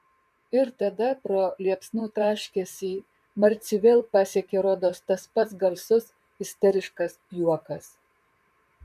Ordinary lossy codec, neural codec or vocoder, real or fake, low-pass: MP3, 96 kbps; vocoder, 44.1 kHz, 128 mel bands, Pupu-Vocoder; fake; 14.4 kHz